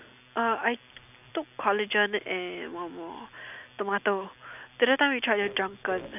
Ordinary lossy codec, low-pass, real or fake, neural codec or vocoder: none; 3.6 kHz; real; none